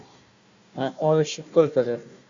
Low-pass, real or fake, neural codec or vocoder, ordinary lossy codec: 7.2 kHz; fake; codec, 16 kHz, 1 kbps, FunCodec, trained on Chinese and English, 50 frames a second; Opus, 64 kbps